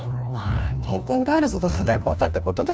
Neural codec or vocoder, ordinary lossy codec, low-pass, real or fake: codec, 16 kHz, 1 kbps, FunCodec, trained on LibriTTS, 50 frames a second; none; none; fake